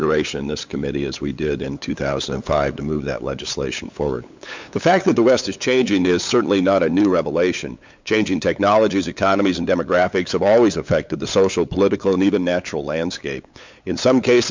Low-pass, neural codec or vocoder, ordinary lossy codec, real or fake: 7.2 kHz; codec, 16 kHz, 8 kbps, FunCodec, trained on LibriTTS, 25 frames a second; MP3, 64 kbps; fake